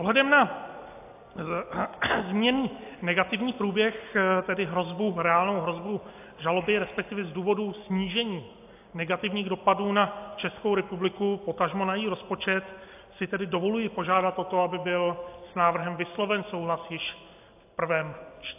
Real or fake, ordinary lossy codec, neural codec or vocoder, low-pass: real; AAC, 32 kbps; none; 3.6 kHz